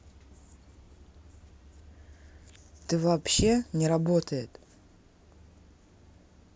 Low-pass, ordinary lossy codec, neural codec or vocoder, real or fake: none; none; none; real